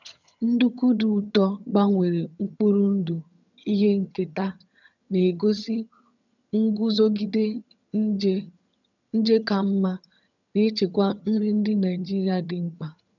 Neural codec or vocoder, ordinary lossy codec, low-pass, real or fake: vocoder, 22.05 kHz, 80 mel bands, HiFi-GAN; none; 7.2 kHz; fake